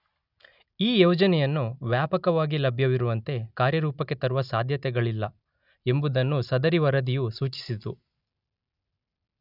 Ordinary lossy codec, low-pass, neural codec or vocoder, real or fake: none; 5.4 kHz; none; real